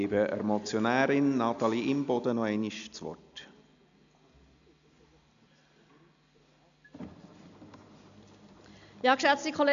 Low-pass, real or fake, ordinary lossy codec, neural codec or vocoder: 7.2 kHz; real; none; none